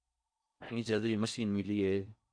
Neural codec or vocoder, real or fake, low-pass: codec, 16 kHz in and 24 kHz out, 0.6 kbps, FocalCodec, streaming, 4096 codes; fake; 9.9 kHz